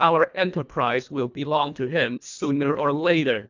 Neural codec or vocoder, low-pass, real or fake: codec, 24 kHz, 1.5 kbps, HILCodec; 7.2 kHz; fake